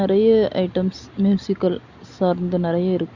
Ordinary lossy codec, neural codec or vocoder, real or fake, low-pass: Opus, 64 kbps; none; real; 7.2 kHz